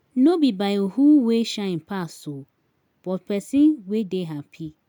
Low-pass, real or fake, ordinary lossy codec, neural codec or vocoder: 19.8 kHz; real; none; none